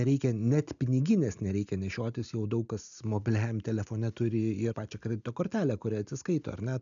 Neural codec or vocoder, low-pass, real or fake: none; 7.2 kHz; real